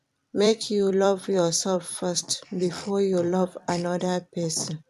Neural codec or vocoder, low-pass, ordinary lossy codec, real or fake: vocoder, 44.1 kHz, 128 mel bands every 256 samples, BigVGAN v2; 14.4 kHz; none; fake